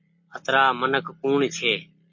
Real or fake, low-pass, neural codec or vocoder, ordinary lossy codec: real; 7.2 kHz; none; MP3, 32 kbps